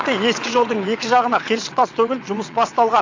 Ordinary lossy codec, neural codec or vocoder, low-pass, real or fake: AAC, 48 kbps; vocoder, 22.05 kHz, 80 mel bands, WaveNeXt; 7.2 kHz; fake